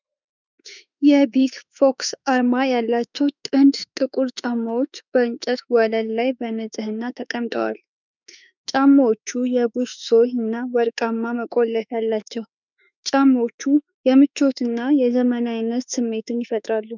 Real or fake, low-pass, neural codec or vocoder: fake; 7.2 kHz; autoencoder, 48 kHz, 32 numbers a frame, DAC-VAE, trained on Japanese speech